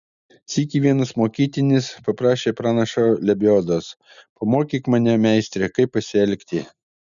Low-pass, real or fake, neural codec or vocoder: 7.2 kHz; real; none